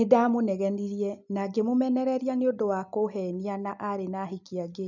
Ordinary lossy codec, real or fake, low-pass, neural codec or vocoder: none; real; 7.2 kHz; none